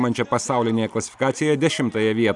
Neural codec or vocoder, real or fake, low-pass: none; real; 10.8 kHz